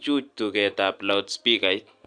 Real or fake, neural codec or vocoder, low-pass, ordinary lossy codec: real; none; 9.9 kHz; AAC, 64 kbps